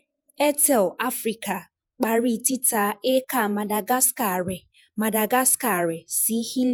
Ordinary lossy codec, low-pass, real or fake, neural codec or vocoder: none; none; fake; vocoder, 48 kHz, 128 mel bands, Vocos